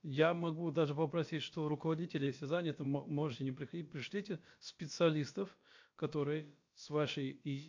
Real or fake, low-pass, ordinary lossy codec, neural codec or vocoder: fake; 7.2 kHz; MP3, 48 kbps; codec, 16 kHz, about 1 kbps, DyCAST, with the encoder's durations